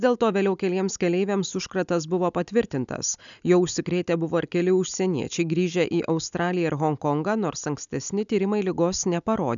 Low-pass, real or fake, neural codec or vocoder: 7.2 kHz; real; none